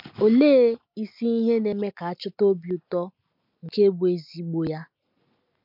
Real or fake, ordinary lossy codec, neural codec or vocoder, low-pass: real; MP3, 48 kbps; none; 5.4 kHz